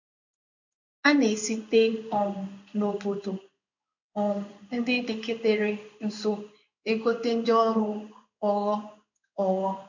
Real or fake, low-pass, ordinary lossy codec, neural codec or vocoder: fake; 7.2 kHz; none; codec, 16 kHz in and 24 kHz out, 1 kbps, XY-Tokenizer